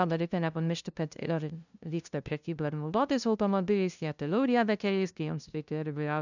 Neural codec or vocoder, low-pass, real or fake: codec, 16 kHz, 0.5 kbps, FunCodec, trained on LibriTTS, 25 frames a second; 7.2 kHz; fake